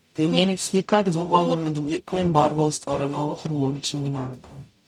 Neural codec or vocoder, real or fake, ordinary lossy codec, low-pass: codec, 44.1 kHz, 0.9 kbps, DAC; fake; none; 19.8 kHz